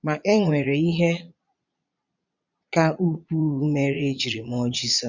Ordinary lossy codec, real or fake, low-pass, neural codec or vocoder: none; fake; 7.2 kHz; vocoder, 22.05 kHz, 80 mel bands, Vocos